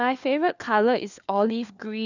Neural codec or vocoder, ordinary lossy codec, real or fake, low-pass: codec, 16 kHz, 4 kbps, FunCodec, trained on LibriTTS, 50 frames a second; none; fake; 7.2 kHz